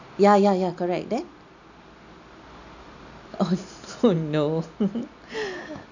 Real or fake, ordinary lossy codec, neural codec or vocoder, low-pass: real; none; none; 7.2 kHz